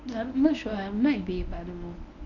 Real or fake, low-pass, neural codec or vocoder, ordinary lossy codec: fake; 7.2 kHz; codec, 24 kHz, 0.9 kbps, WavTokenizer, medium speech release version 1; none